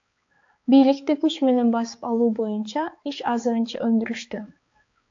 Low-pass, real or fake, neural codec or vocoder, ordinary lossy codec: 7.2 kHz; fake; codec, 16 kHz, 4 kbps, X-Codec, HuBERT features, trained on balanced general audio; AAC, 48 kbps